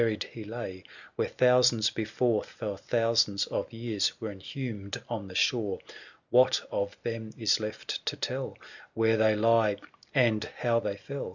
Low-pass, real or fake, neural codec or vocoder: 7.2 kHz; real; none